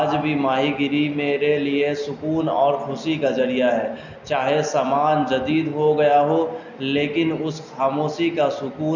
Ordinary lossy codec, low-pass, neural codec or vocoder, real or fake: none; 7.2 kHz; none; real